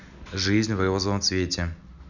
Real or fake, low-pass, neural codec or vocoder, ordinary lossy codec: real; 7.2 kHz; none; none